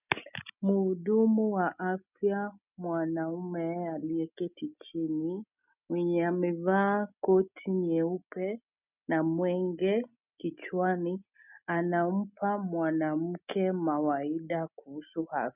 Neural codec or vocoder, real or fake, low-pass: none; real; 3.6 kHz